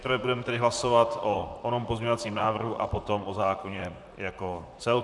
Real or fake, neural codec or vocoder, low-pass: fake; vocoder, 44.1 kHz, 128 mel bands, Pupu-Vocoder; 10.8 kHz